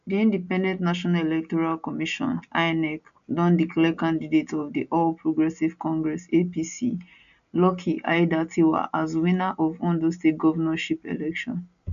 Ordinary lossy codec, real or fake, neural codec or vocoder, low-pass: AAC, 96 kbps; real; none; 7.2 kHz